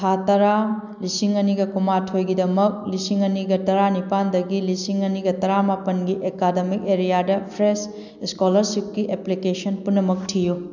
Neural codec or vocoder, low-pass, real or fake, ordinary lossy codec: none; 7.2 kHz; real; none